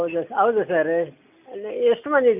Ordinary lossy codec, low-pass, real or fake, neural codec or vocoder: none; 3.6 kHz; real; none